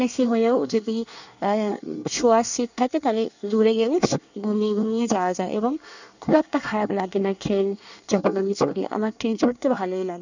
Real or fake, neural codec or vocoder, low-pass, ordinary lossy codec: fake; codec, 24 kHz, 1 kbps, SNAC; 7.2 kHz; none